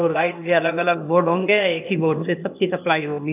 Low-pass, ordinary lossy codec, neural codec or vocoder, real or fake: 3.6 kHz; none; codec, 16 kHz, 0.8 kbps, ZipCodec; fake